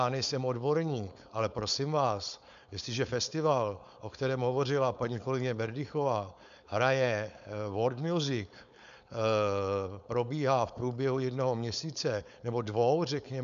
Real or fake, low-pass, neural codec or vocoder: fake; 7.2 kHz; codec, 16 kHz, 4.8 kbps, FACodec